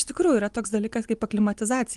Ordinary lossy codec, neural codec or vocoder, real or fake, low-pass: Opus, 24 kbps; none; real; 10.8 kHz